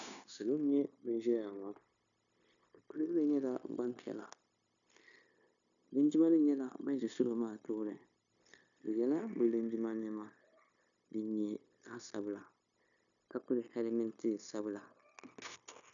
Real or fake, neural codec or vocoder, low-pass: fake; codec, 16 kHz, 0.9 kbps, LongCat-Audio-Codec; 7.2 kHz